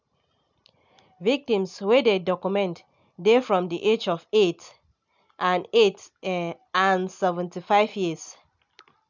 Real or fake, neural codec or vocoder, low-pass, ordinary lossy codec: real; none; 7.2 kHz; none